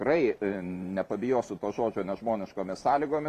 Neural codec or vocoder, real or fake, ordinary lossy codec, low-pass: vocoder, 44.1 kHz, 128 mel bands every 512 samples, BigVGAN v2; fake; MP3, 64 kbps; 14.4 kHz